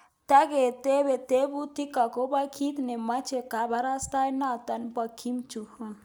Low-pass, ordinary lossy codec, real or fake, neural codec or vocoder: none; none; real; none